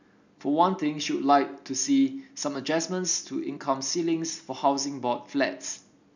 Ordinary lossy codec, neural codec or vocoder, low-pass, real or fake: none; none; 7.2 kHz; real